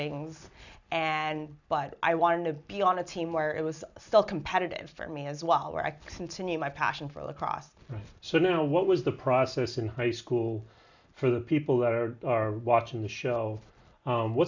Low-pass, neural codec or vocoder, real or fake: 7.2 kHz; none; real